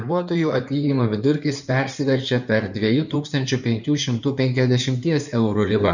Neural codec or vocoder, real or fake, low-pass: codec, 16 kHz in and 24 kHz out, 2.2 kbps, FireRedTTS-2 codec; fake; 7.2 kHz